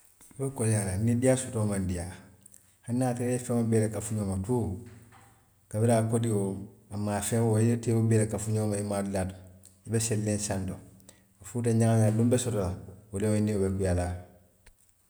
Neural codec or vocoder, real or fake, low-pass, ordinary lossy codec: none; real; none; none